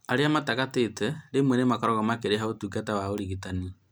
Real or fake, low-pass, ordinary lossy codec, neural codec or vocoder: real; none; none; none